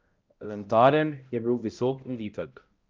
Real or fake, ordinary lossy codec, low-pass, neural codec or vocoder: fake; Opus, 24 kbps; 7.2 kHz; codec, 16 kHz, 0.5 kbps, X-Codec, HuBERT features, trained on balanced general audio